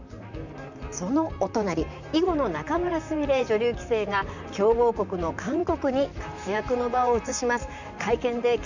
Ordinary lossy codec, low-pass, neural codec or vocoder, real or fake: none; 7.2 kHz; vocoder, 44.1 kHz, 128 mel bands, Pupu-Vocoder; fake